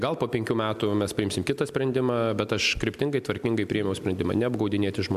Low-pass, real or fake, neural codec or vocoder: 14.4 kHz; real; none